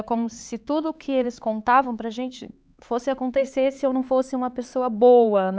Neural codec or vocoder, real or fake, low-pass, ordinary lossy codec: codec, 16 kHz, 4 kbps, X-Codec, HuBERT features, trained on LibriSpeech; fake; none; none